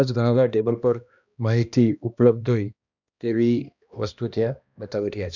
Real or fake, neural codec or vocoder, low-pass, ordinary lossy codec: fake; codec, 16 kHz, 1 kbps, X-Codec, HuBERT features, trained on balanced general audio; 7.2 kHz; none